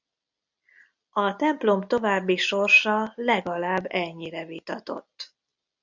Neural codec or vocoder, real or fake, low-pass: none; real; 7.2 kHz